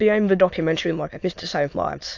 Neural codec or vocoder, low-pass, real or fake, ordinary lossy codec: autoencoder, 22.05 kHz, a latent of 192 numbers a frame, VITS, trained on many speakers; 7.2 kHz; fake; AAC, 48 kbps